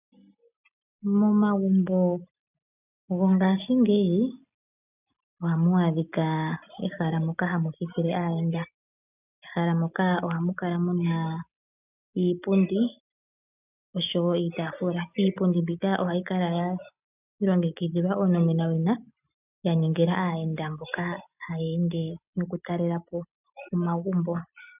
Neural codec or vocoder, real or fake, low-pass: none; real; 3.6 kHz